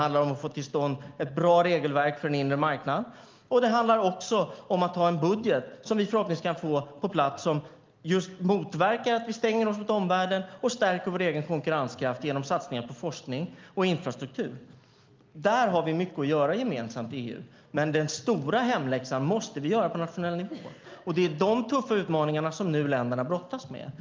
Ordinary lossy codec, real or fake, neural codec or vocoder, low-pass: Opus, 32 kbps; real; none; 7.2 kHz